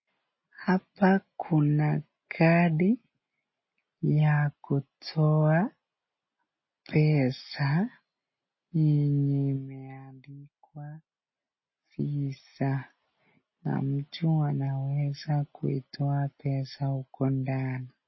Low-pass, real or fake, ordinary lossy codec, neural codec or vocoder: 7.2 kHz; real; MP3, 24 kbps; none